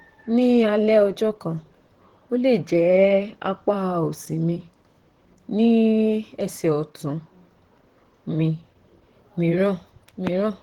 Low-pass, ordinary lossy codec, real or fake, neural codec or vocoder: 19.8 kHz; Opus, 16 kbps; fake; vocoder, 44.1 kHz, 128 mel bands, Pupu-Vocoder